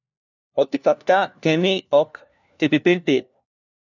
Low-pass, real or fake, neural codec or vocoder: 7.2 kHz; fake; codec, 16 kHz, 1 kbps, FunCodec, trained on LibriTTS, 50 frames a second